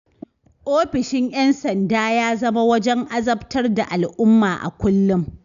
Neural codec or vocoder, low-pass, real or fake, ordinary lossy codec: none; 7.2 kHz; real; none